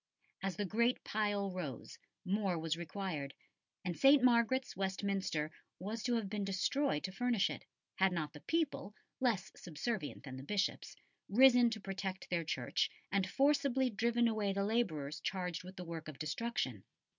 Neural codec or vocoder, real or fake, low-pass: none; real; 7.2 kHz